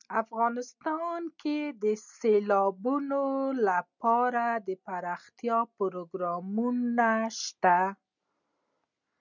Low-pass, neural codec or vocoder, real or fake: 7.2 kHz; none; real